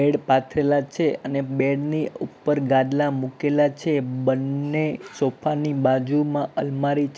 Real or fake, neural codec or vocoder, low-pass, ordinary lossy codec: real; none; none; none